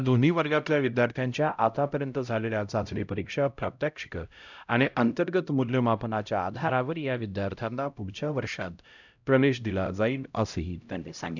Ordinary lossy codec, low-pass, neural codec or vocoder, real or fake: none; 7.2 kHz; codec, 16 kHz, 0.5 kbps, X-Codec, HuBERT features, trained on LibriSpeech; fake